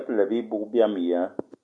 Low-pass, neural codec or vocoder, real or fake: 9.9 kHz; none; real